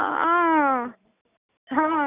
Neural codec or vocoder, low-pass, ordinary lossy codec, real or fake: none; 3.6 kHz; none; real